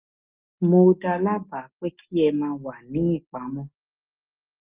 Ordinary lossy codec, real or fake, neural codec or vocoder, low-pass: Opus, 24 kbps; real; none; 3.6 kHz